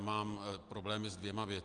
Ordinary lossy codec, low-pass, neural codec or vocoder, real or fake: Opus, 32 kbps; 9.9 kHz; none; real